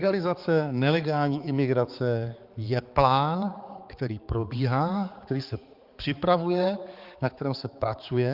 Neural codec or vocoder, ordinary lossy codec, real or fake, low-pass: codec, 16 kHz, 4 kbps, X-Codec, HuBERT features, trained on balanced general audio; Opus, 24 kbps; fake; 5.4 kHz